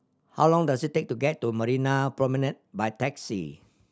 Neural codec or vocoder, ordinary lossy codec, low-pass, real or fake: none; none; none; real